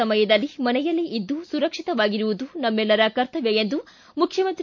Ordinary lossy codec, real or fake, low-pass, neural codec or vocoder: MP3, 48 kbps; real; 7.2 kHz; none